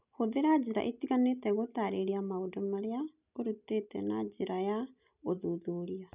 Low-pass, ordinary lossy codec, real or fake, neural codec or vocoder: 3.6 kHz; none; real; none